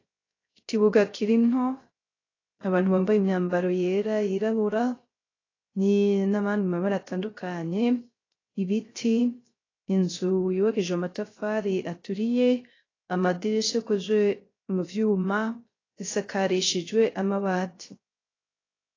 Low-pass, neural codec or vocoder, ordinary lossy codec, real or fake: 7.2 kHz; codec, 16 kHz, 0.3 kbps, FocalCodec; AAC, 32 kbps; fake